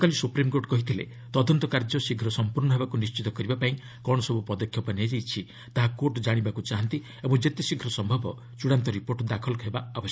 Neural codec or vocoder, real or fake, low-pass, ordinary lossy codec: none; real; none; none